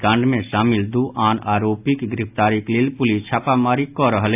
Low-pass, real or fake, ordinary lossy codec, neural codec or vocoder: 3.6 kHz; real; AAC, 32 kbps; none